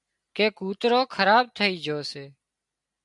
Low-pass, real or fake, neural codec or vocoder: 10.8 kHz; real; none